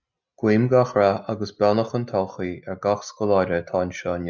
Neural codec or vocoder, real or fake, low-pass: none; real; 7.2 kHz